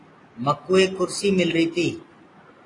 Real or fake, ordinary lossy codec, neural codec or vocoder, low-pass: real; AAC, 32 kbps; none; 10.8 kHz